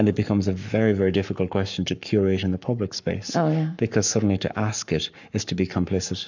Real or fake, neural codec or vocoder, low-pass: fake; codec, 44.1 kHz, 7.8 kbps, Pupu-Codec; 7.2 kHz